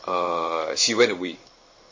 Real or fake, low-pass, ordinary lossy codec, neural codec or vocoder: fake; 7.2 kHz; MP3, 32 kbps; codec, 16 kHz in and 24 kHz out, 1 kbps, XY-Tokenizer